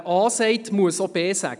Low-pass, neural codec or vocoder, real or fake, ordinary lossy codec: 10.8 kHz; none; real; none